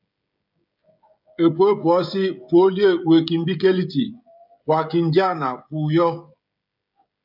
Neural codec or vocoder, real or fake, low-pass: codec, 16 kHz, 16 kbps, FreqCodec, smaller model; fake; 5.4 kHz